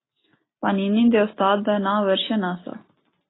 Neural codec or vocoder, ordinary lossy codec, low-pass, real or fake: none; AAC, 16 kbps; 7.2 kHz; real